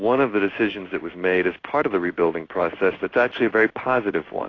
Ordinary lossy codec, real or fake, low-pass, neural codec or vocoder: AAC, 32 kbps; fake; 7.2 kHz; codec, 16 kHz in and 24 kHz out, 1 kbps, XY-Tokenizer